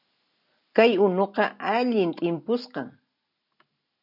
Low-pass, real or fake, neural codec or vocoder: 5.4 kHz; real; none